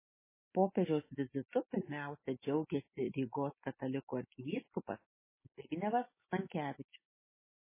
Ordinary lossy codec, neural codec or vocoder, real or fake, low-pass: MP3, 16 kbps; none; real; 3.6 kHz